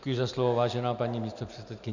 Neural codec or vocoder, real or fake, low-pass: none; real; 7.2 kHz